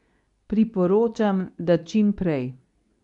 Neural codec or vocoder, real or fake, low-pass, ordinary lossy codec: codec, 24 kHz, 0.9 kbps, WavTokenizer, medium speech release version 2; fake; 10.8 kHz; none